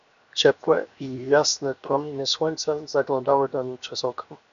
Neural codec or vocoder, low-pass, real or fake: codec, 16 kHz, 0.7 kbps, FocalCodec; 7.2 kHz; fake